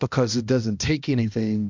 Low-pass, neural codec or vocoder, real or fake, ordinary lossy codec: 7.2 kHz; codec, 16 kHz, 1 kbps, X-Codec, HuBERT features, trained on balanced general audio; fake; MP3, 64 kbps